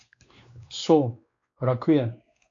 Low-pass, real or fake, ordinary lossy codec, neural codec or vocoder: 7.2 kHz; fake; AAC, 64 kbps; codec, 16 kHz, 2 kbps, X-Codec, WavLM features, trained on Multilingual LibriSpeech